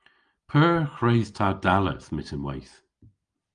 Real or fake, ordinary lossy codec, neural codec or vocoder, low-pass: real; Opus, 32 kbps; none; 9.9 kHz